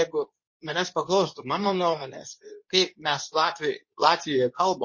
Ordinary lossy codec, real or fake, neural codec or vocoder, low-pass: MP3, 32 kbps; fake; codec, 24 kHz, 0.9 kbps, WavTokenizer, medium speech release version 2; 7.2 kHz